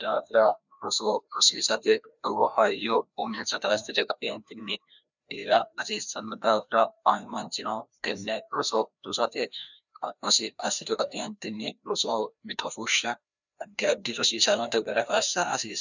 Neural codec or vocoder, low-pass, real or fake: codec, 16 kHz, 1 kbps, FreqCodec, larger model; 7.2 kHz; fake